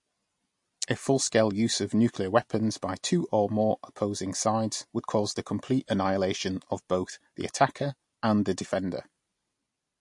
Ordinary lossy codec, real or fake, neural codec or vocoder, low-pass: MP3, 48 kbps; real; none; 10.8 kHz